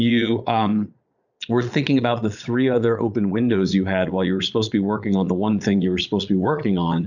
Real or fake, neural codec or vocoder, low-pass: fake; vocoder, 22.05 kHz, 80 mel bands, Vocos; 7.2 kHz